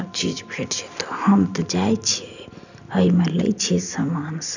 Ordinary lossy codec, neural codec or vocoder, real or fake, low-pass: none; none; real; 7.2 kHz